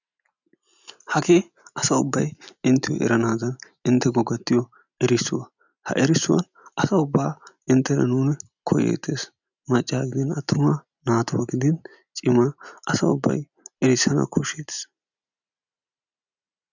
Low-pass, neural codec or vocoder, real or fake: 7.2 kHz; none; real